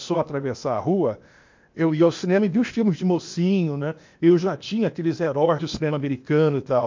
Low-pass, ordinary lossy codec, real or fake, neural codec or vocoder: 7.2 kHz; MP3, 48 kbps; fake; codec, 16 kHz, 0.8 kbps, ZipCodec